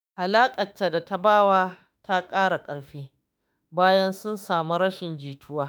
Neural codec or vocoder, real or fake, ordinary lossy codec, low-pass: autoencoder, 48 kHz, 32 numbers a frame, DAC-VAE, trained on Japanese speech; fake; none; none